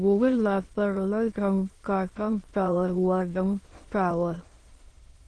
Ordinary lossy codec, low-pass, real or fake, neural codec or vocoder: Opus, 16 kbps; 9.9 kHz; fake; autoencoder, 22.05 kHz, a latent of 192 numbers a frame, VITS, trained on many speakers